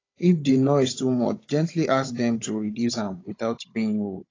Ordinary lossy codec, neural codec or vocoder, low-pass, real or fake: AAC, 32 kbps; codec, 16 kHz, 16 kbps, FunCodec, trained on Chinese and English, 50 frames a second; 7.2 kHz; fake